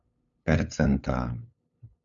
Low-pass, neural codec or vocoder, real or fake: 7.2 kHz; codec, 16 kHz, 8 kbps, FunCodec, trained on LibriTTS, 25 frames a second; fake